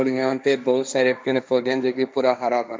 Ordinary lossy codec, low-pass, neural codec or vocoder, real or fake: none; none; codec, 16 kHz, 1.1 kbps, Voila-Tokenizer; fake